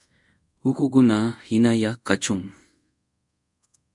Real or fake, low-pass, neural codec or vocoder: fake; 10.8 kHz; codec, 24 kHz, 0.5 kbps, DualCodec